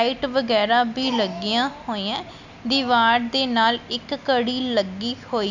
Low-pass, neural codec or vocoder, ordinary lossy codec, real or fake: 7.2 kHz; none; none; real